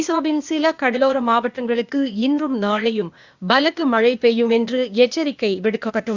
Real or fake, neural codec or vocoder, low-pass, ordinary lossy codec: fake; codec, 16 kHz, 0.8 kbps, ZipCodec; 7.2 kHz; Opus, 64 kbps